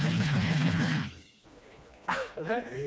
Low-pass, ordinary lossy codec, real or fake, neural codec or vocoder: none; none; fake; codec, 16 kHz, 2 kbps, FreqCodec, smaller model